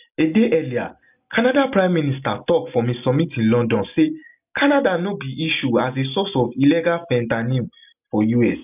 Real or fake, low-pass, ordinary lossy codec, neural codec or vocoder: real; 3.6 kHz; none; none